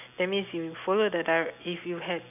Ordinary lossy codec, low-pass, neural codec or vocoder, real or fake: none; 3.6 kHz; none; real